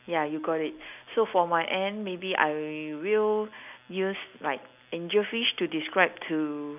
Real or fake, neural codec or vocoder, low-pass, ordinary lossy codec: real; none; 3.6 kHz; none